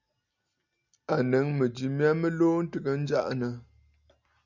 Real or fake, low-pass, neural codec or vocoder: real; 7.2 kHz; none